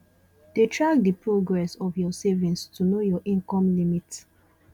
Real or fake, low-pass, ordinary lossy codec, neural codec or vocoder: real; 19.8 kHz; none; none